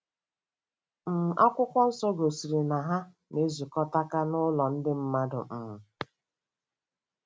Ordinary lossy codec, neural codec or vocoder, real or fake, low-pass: none; none; real; none